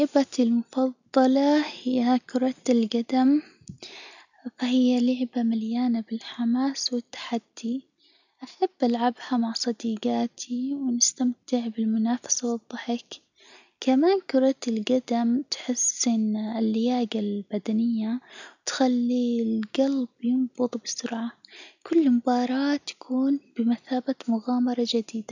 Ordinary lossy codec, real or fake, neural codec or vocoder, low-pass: none; real; none; 7.2 kHz